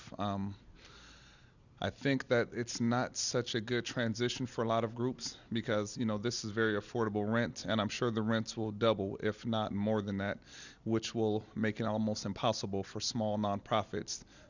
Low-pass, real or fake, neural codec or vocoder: 7.2 kHz; real; none